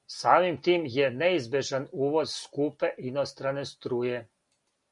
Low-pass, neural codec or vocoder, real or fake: 10.8 kHz; none; real